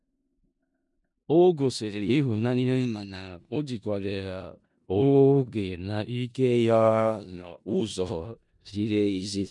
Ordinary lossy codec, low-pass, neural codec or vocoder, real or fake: MP3, 96 kbps; 10.8 kHz; codec, 16 kHz in and 24 kHz out, 0.4 kbps, LongCat-Audio-Codec, four codebook decoder; fake